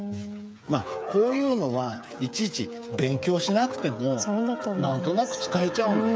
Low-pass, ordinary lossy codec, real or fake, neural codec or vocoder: none; none; fake; codec, 16 kHz, 8 kbps, FreqCodec, smaller model